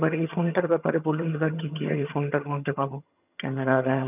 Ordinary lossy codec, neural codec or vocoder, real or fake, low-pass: none; vocoder, 22.05 kHz, 80 mel bands, HiFi-GAN; fake; 3.6 kHz